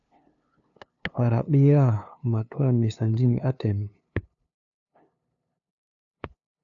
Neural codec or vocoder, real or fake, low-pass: codec, 16 kHz, 2 kbps, FunCodec, trained on LibriTTS, 25 frames a second; fake; 7.2 kHz